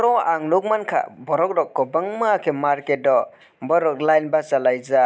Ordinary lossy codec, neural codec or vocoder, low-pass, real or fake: none; none; none; real